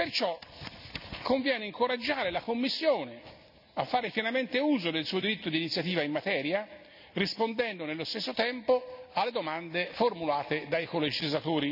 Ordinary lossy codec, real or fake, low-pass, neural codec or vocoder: none; real; 5.4 kHz; none